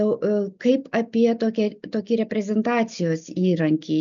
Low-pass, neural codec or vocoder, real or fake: 7.2 kHz; none; real